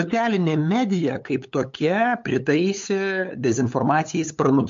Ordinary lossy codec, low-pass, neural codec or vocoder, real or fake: MP3, 48 kbps; 7.2 kHz; codec, 16 kHz, 8 kbps, FunCodec, trained on LibriTTS, 25 frames a second; fake